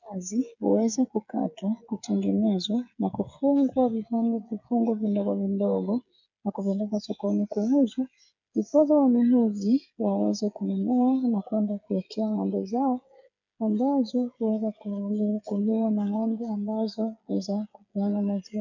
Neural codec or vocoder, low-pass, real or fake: codec, 16 kHz, 8 kbps, FreqCodec, smaller model; 7.2 kHz; fake